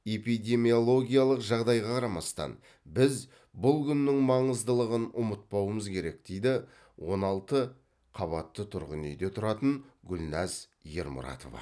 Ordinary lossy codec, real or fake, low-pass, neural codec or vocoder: none; real; none; none